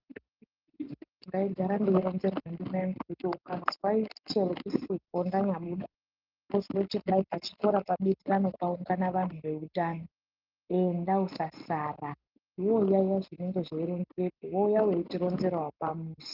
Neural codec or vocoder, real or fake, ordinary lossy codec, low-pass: none; real; Opus, 24 kbps; 5.4 kHz